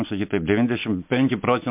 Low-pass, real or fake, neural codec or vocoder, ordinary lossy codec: 3.6 kHz; real; none; MP3, 32 kbps